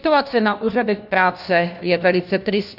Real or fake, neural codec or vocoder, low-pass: fake; codec, 16 kHz, 1 kbps, FunCodec, trained on LibriTTS, 50 frames a second; 5.4 kHz